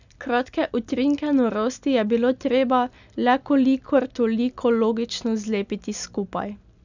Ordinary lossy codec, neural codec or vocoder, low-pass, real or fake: none; none; 7.2 kHz; real